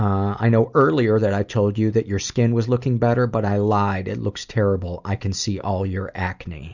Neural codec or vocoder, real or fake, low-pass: none; real; 7.2 kHz